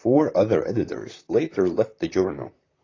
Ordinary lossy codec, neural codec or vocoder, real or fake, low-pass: AAC, 32 kbps; vocoder, 44.1 kHz, 128 mel bands, Pupu-Vocoder; fake; 7.2 kHz